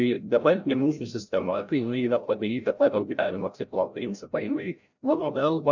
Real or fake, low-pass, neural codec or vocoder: fake; 7.2 kHz; codec, 16 kHz, 0.5 kbps, FreqCodec, larger model